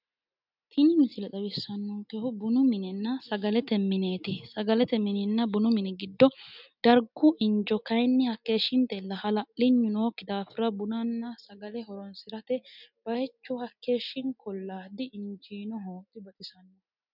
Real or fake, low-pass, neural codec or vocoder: real; 5.4 kHz; none